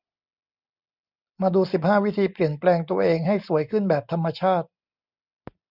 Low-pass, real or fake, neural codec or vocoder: 5.4 kHz; real; none